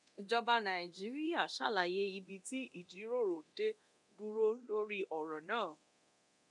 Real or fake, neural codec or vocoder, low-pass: fake; codec, 24 kHz, 0.9 kbps, DualCodec; 10.8 kHz